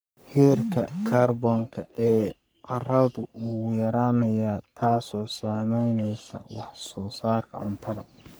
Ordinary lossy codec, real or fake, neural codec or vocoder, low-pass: none; fake; codec, 44.1 kHz, 3.4 kbps, Pupu-Codec; none